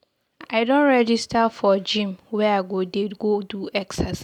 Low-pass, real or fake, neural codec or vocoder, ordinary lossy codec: 19.8 kHz; real; none; none